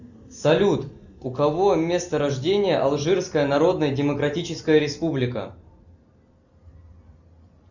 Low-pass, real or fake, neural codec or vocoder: 7.2 kHz; real; none